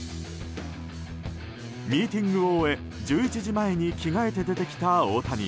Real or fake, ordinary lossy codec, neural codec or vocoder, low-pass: real; none; none; none